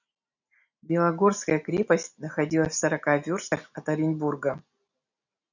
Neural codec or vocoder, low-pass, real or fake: none; 7.2 kHz; real